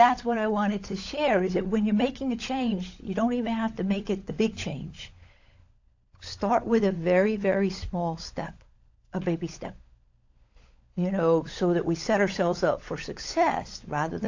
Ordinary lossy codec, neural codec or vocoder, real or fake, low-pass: AAC, 48 kbps; codec, 16 kHz, 16 kbps, FunCodec, trained on LibriTTS, 50 frames a second; fake; 7.2 kHz